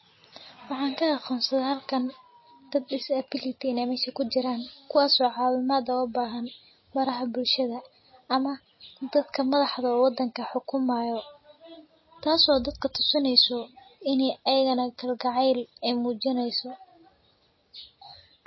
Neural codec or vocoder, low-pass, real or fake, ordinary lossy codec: none; 7.2 kHz; real; MP3, 24 kbps